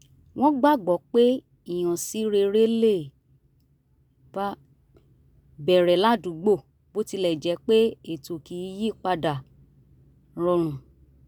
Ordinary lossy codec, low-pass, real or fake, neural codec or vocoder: none; none; real; none